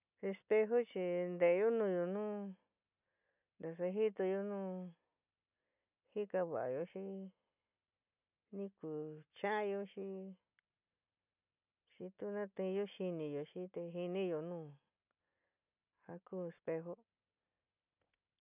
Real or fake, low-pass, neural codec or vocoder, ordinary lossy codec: real; 3.6 kHz; none; none